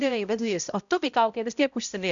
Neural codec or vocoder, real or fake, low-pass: codec, 16 kHz, 0.5 kbps, X-Codec, HuBERT features, trained on balanced general audio; fake; 7.2 kHz